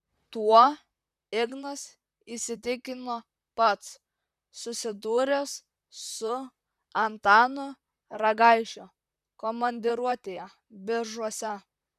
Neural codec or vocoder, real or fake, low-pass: vocoder, 44.1 kHz, 128 mel bands, Pupu-Vocoder; fake; 14.4 kHz